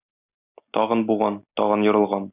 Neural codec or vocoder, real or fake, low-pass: none; real; 3.6 kHz